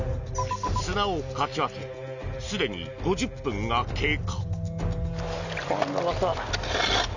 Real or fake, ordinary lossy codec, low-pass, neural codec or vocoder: real; none; 7.2 kHz; none